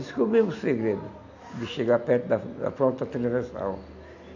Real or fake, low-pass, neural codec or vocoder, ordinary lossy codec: real; 7.2 kHz; none; none